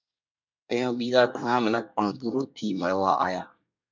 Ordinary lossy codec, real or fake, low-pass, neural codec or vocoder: MP3, 64 kbps; fake; 7.2 kHz; codec, 24 kHz, 1 kbps, SNAC